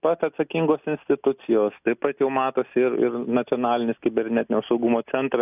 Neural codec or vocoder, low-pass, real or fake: none; 3.6 kHz; real